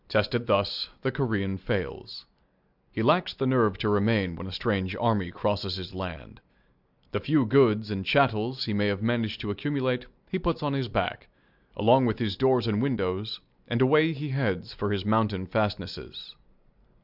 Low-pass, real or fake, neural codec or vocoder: 5.4 kHz; real; none